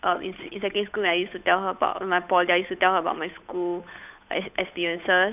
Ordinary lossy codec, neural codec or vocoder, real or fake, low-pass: none; codec, 16 kHz, 8 kbps, FunCodec, trained on Chinese and English, 25 frames a second; fake; 3.6 kHz